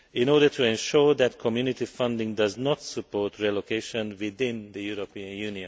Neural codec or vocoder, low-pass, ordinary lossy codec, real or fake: none; none; none; real